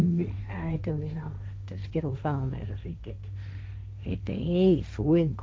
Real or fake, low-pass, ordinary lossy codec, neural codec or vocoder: fake; none; none; codec, 16 kHz, 1.1 kbps, Voila-Tokenizer